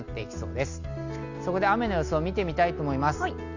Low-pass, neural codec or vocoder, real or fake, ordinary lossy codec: 7.2 kHz; none; real; none